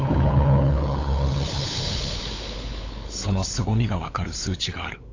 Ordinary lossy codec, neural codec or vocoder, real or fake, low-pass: AAC, 32 kbps; codec, 16 kHz, 8 kbps, FunCodec, trained on LibriTTS, 25 frames a second; fake; 7.2 kHz